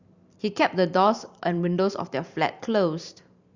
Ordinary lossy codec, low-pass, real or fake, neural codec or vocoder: Opus, 64 kbps; 7.2 kHz; fake; vocoder, 44.1 kHz, 128 mel bands every 512 samples, BigVGAN v2